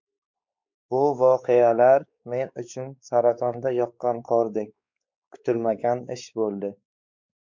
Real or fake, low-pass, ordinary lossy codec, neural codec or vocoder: fake; 7.2 kHz; MP3, 64 kbps; codec, 16 kHz, 4 kbps, X-Codec, WavLM features, trained on Multilingual LibriSpeech